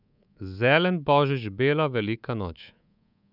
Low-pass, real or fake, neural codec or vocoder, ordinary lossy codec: 5.4 kHz; fake; codec, 24 kHz, 3.1 kbps, DualCodec; none